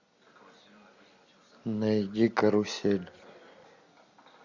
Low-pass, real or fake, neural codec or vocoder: 7.2 kHz; real; none